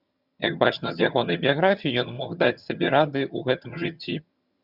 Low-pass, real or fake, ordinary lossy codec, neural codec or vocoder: 5.4 kHz; fake; Opus, 64 kbps; vocoder, 22.05 kHz, 80 mel bands, HiFi-GAN